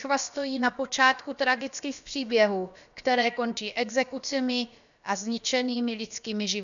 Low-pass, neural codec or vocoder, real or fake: 7.2 kHz; codec, 16 kHz, about 1 kbps, DyCAST, with the encoder's durations; fake